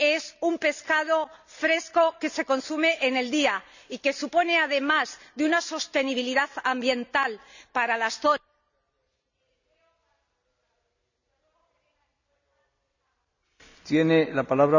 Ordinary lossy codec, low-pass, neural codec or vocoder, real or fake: none; 7.2 kHz; none; real